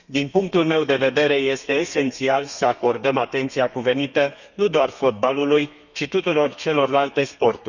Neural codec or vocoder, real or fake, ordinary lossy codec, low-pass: codec, 32 kHz, 1.9 kbps, SNAC; fake; none; 7.2 kHz